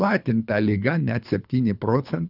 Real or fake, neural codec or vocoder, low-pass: fake; codec, 24 kHz, 3 kbps, HILCodec; 5.4 kHz